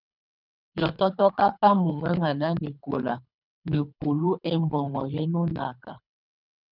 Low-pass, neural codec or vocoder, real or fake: 5.4 kHz; codec, 24 kHz, 3 kbps, HILCodec; fake